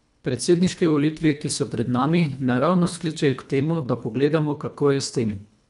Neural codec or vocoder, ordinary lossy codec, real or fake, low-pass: codec, 24 kHz, 1.5 kbps, HILCodec; none; fake; 10.8 kHz